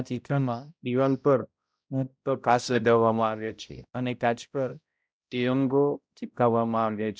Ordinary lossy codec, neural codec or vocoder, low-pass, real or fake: none; codec, 16 kHz, 0.5 kbps, X-Codec, HuBERT features, trained on balanced general audio; none; fake